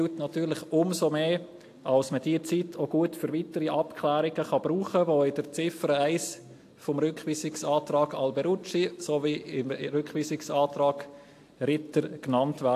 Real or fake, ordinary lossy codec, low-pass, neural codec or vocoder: real; AAC, 64 kbps; 14.4 kHz; none